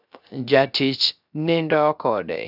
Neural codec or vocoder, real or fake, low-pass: codec, 16 kHz, 0.3 kbps, FocalCodec; fake; 5.4 kHz